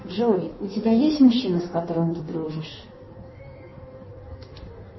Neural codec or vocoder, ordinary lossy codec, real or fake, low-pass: codec, 16 kHz in and 24 kHz out, 1.1 kbps, FireRedTTS-2 codec; MP3, 24 kbps; fake; 7.2 kHz